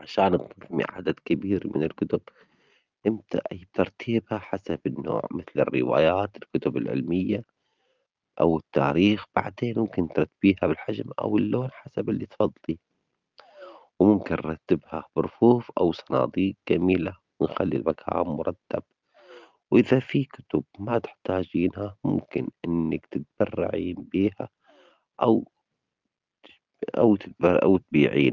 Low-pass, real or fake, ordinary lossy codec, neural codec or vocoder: 7.2 kHz; real; Opus, 24 kbps; none